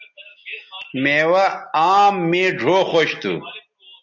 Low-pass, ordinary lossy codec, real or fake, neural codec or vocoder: 7.2 kHz; MP3, 48 kbps; real; none